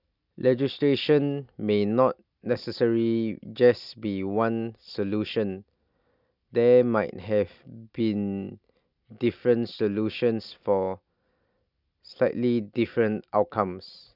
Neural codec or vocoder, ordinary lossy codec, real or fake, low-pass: none; none; real; 5.4 kHz